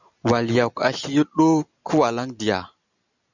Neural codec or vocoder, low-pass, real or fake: none; 7.2 kHz; real